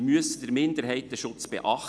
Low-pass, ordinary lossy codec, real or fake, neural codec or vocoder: none; none; real; none